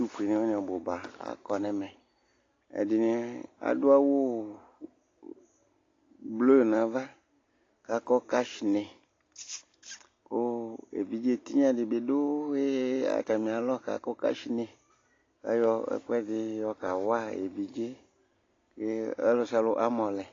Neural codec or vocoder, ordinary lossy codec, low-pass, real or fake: none; AAC, 32 kbps; 7.2 kHz; real